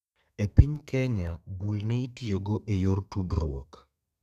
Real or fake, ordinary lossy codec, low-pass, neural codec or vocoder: fake; none; 14.4 kHz; codec, 32 kHz, 1.9 kbps, SNAC